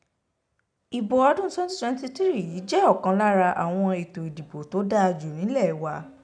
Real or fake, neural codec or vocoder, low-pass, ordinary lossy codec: real; none; 9.9 kHz; none